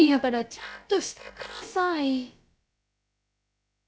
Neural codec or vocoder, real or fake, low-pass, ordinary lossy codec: codec, 16 kHz, about 1 kbps, DyCAST, with the encoder's durations; fake; none; none